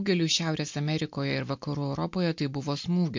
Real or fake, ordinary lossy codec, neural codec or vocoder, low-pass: real; MP3, 48 kbps; none; 7.2 kHz